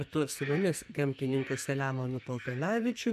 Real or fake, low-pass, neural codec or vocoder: fake; 14.4 kHz; codec, 44.1 kHz, 2.6 kbps, SNAC